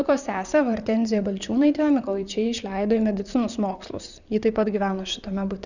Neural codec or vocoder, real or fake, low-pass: vocoder, 44.1 kHz, 128 mel bands, Pupu-Vocoder; fake; 7.2 kHz